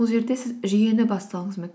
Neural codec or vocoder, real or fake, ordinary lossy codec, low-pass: none; real; none; none